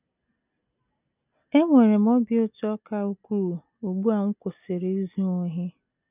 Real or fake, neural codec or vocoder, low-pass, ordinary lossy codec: real; none; 3.6 kHz; none